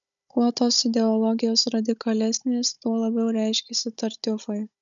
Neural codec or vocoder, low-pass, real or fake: codec, 16 kHz, 16 kbps, FunCodec, trained on Chinese and English, 50 frames a second; 7.2 kHz; fake